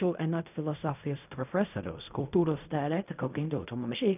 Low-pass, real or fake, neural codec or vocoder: 3.6 kHz; fake; codec, 16 kHz in and 24 kHz out, 0.4 kbps, LongCat-Audio-Codec, fine tuned four codebook decoder